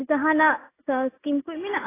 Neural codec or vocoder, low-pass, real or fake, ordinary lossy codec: none; 3.6 kHz; real; AAC, 16 kbps